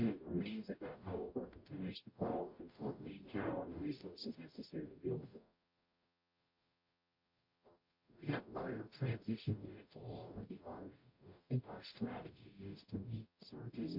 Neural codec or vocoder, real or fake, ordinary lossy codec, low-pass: codec, 44.1 kHz, 0.9 kbps, DAC; fake; MP3, 32 kbps; 5.4 kHz